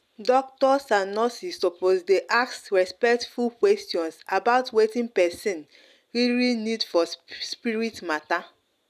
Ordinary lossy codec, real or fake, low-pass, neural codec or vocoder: none; real; 14.4 kHz; none